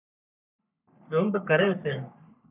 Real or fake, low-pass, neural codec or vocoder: fake; 3.6 kHz; codec, 44.1 kHz, 3.4 kbps, Pupu-Codec